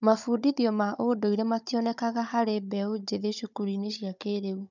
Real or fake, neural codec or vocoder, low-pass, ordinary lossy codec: fake; codec, 16 kHz, 16 kbps, FunCodec, trained on Chinese and English, 50 frames a second; 7.2 kHz; none